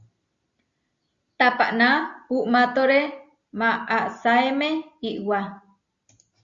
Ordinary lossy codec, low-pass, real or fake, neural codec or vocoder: Opus, 64 kbps; 7.2 kHz; real; none